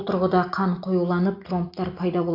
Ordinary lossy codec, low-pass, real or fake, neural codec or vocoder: AAC, 32 kbps; 5.4 kHz; real; none